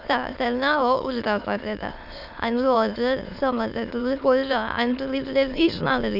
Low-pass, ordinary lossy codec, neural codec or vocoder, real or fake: 5.4 kHz; none; autoencoder, 22.05 kHz, a latent of 192 numbers a frame, VITS, trained on many speakers; fake